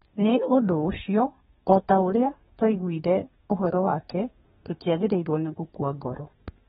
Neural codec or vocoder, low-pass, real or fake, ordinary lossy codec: codec, 32 kHz, 1.9 kbps, SNAC; 14.4 kHz; fake; AAC, 16 kbps